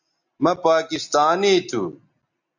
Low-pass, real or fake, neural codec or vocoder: 7.2 kHz; real; none